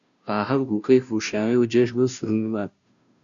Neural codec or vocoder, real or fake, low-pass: codec, 16 kHz, 0.5 kbps, FunCodec, trained on Chinese and English, 25 frames a second; fake; 7.2 kHz